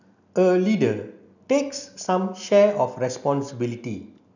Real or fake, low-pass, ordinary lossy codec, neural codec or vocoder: real; 7.2 kHz; none; none